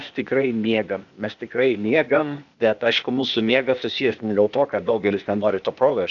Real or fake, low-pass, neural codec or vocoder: fake; 7.2 kHz; codec, 16 kHz, 0.8 kbps, ZipCodec